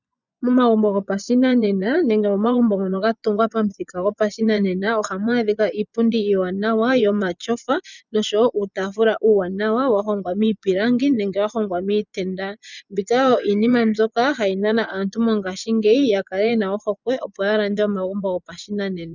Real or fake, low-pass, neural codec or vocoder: fake; 7.2 kHz; vocoder, 24 kHz, 100 mel bands, Vocos